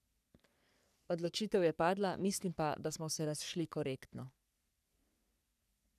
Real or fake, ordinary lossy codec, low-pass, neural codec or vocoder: fake; none; 14.4 kHz; codec, 44.1 kHz, 3.4 kbps, Pupu-Codec